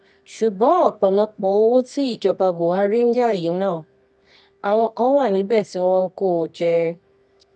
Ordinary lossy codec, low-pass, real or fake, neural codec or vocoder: none; none; fake; codec, 24 kHz, 0.9 kbps, WavTokenizer, medium music audio release